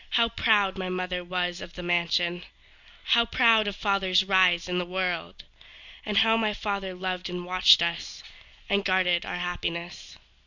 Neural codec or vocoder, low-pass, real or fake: none; 7.2 kHz; real